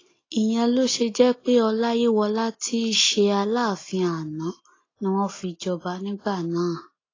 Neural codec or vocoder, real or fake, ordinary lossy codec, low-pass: none; real; AAC, 32 kbps; 7.2 kHz